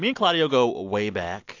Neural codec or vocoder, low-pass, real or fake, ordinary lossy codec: none; 7.2 kHz; real; AAC, 48 kbps